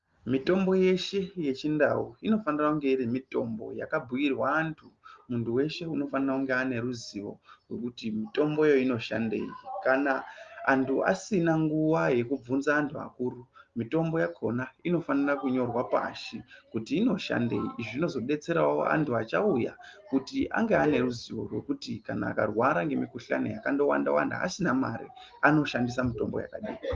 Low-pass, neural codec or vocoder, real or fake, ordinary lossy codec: 7.2 kHz; none; real; Opus, 32 kbps